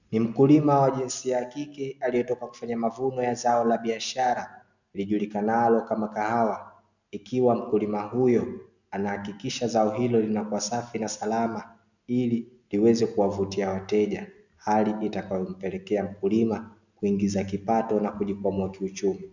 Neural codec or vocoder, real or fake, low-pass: none; real; 7.2 kHz